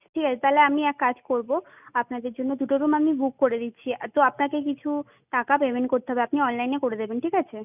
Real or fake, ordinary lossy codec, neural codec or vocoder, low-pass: real; none; none; 3.6 kHz